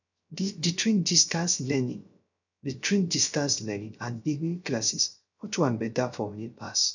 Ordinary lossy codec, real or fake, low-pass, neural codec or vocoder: none; fake; 7.2 kHz; codec, 16 kHz, 0.3 kbps, FocalCodec